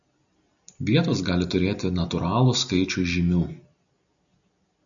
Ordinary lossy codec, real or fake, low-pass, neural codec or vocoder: MP3, 96 kbps; real; 7.2 kHz; none